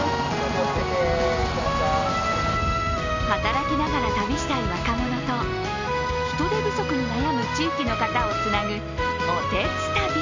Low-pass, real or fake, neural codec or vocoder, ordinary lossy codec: 7.2 kHz; real; none; MP3, 64 kbps